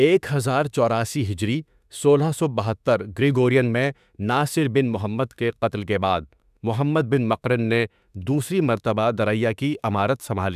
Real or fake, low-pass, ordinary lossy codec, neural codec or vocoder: fake; 14.4 kHz; none; autoencoder, 48 kHz, 32 numbers a frame, DAC-VAE, trained on Japanese speech